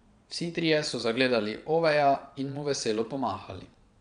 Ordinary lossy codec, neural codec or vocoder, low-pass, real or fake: none; vocoder, 22.05 kHz, 80 mel bands, Vocos; 9.9 kHz; fake